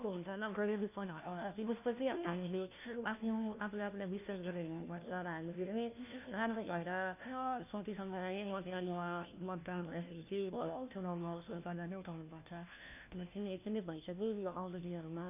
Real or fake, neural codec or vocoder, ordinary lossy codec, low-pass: fake; codec, 16 kHz, 1 kbps, FunCodec, trained on LibriTTS, 50 frames a second; none; 3.6 kHz